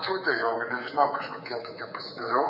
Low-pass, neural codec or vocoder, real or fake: 5.4 kHz; codec, 24 kHz, 3.1 kbps, DualCodec; fake